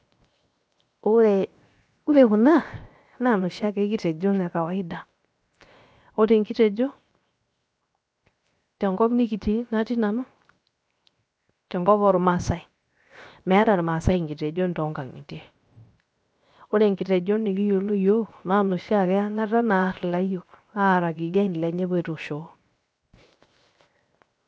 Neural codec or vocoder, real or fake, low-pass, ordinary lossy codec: codec, 16 kHz, 0.7 kbps, FocalCodec; fake; none; none